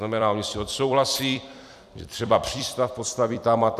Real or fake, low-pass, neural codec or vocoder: fake; 14.4 kHz; vocoder, 44.1 kHz, 128 mel bands every 256 samples, BigVGAN v2